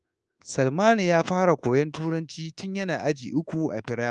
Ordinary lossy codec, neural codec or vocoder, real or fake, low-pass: Opus, 24 kbps; codec, 24 kHz, 1.2 kbps, DualCodec; fake; 10.8 kHz